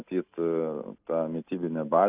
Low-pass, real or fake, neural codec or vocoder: 3.6 kHz; real; none